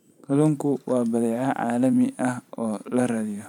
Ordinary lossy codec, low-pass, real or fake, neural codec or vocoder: none; 19.8 kHz; fake; vocoder, 44.1 kHz, 128 mel bands every 512 samples, BigVGAN v2